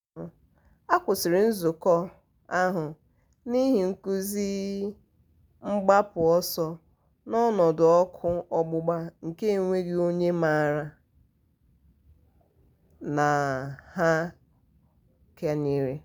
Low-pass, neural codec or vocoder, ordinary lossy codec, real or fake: none; none; none; real